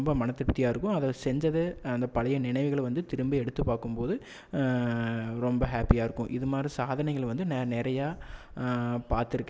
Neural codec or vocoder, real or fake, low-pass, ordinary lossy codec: none; real; none; none